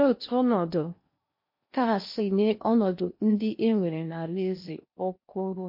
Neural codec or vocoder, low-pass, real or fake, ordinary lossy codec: codec, 16 kHz in and 24 kHz out, 0.8 kbps, FocalCodec, streaming, 65536 codes; 5.4 kHz; fake; MP3, 32 kbps